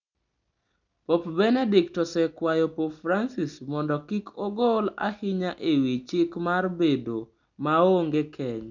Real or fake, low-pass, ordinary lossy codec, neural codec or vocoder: real; 7.2 kHz; none; none